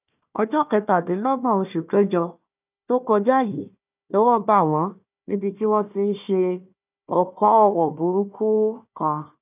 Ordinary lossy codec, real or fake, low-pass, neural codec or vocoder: none; fake; 3.6 kHz; codec, 16 kHz, 1 kbps, FunCodec, trained on Chinese and English, 50 frames a second